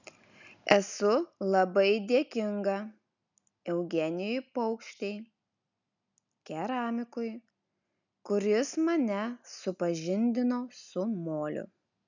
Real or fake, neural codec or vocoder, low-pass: real; none; 7.2 kHz